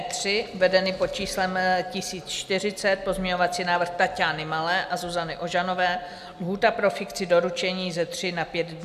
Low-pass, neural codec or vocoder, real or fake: 14.4 kHz; none; real